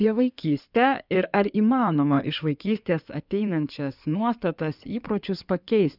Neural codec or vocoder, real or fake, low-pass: codec, 16 kHz in and 24 kHz out, 2.2 kbps, FireRedTTS-2 codec; fake; 5.4 kHz